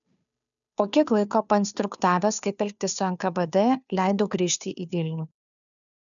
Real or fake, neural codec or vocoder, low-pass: fake; codec, 16 kHz, 2 kbps, FunCodec, trained on Chinese and English, 25 frames a second; 7.2 kHz